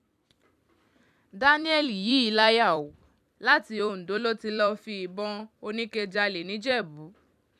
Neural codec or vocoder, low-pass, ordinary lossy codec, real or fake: vocoder, 44.1 kHz, 128 mel bands every 256 samples, BigVGAN v2; 14.4 kHz; none; fake